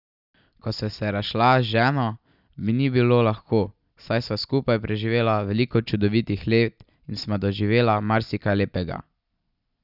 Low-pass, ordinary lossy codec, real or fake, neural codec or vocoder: 5.4 kHz; none; real; none